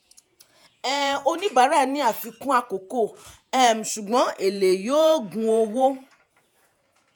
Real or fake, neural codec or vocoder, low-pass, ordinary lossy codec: fake; vocoder, 48 kHz, 128 mel bands, Vocos; none; none